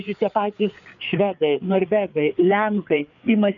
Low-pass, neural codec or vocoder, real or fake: 7.2 kHz; codec, 16 kHz, 4 kbps, FreqCodec, larger model; fake